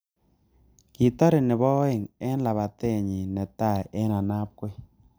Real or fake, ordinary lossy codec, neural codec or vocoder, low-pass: real; none; none; none